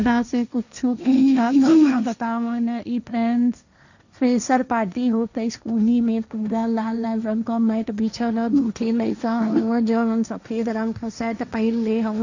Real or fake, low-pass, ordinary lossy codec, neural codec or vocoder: fake; 7.2 kHz; none; codec, 16 kHz, 1.1 kbps, Voila-Tokenizer